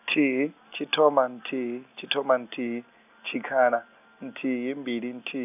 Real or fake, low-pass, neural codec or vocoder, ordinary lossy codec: real; 3.6 kHz; none; none